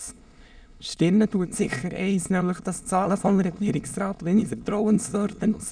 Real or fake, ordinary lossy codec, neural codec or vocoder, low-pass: fake; none; autoencoder, 22.05 kHz, a latent of 192 numbers a frame, VITS, trained on many speakers; 9.9 kHz